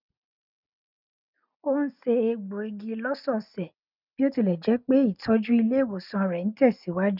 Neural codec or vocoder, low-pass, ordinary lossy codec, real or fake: none; 5.4 kHz; none; real